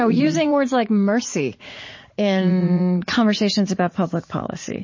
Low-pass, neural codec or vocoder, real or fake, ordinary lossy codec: 7.2 kHz; vocoder, 44.1 kHz, 80 mel bands, Vocos; fake; MP3, 32 kbps